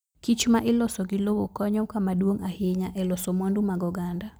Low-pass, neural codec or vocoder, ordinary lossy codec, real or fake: none; none; none; real